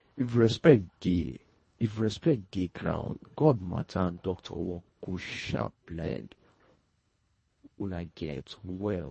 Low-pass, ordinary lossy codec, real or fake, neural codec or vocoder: 10.8 kHz; MP3, 32 kbps; fake; codec, 24 kHz, 1.5 kbps, HILCodec